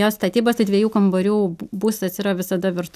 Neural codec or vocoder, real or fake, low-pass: none; real; 14.4 kHz